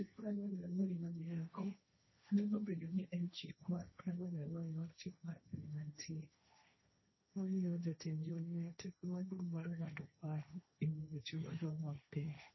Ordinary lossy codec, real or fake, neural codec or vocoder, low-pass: MP3, 24 kbps; fake; codec, 16 kHz, 1.1 kbps, Voila-Tokenizer; 7.2 kHz